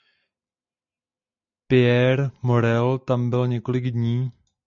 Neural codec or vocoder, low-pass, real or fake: none; 7.2 kHz; real